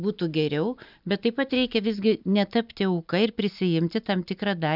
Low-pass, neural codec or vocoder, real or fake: 5.4 kHz; none; real